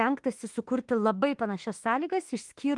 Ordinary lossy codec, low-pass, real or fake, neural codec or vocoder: Opus, 24 kbps; 10.8 kHz; fake; autoencoder, 48 kHz, 32 numbers a frame, DAC-VAE, trained on Japanese speech